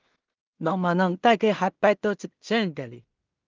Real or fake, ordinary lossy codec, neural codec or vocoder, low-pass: fake; Opus, 24 kbps; codec, 16 kHz in and 24 kHz out, 0.4 kbps, LongCat-Audio-Codec, two codebook decoder; 7.2 kHz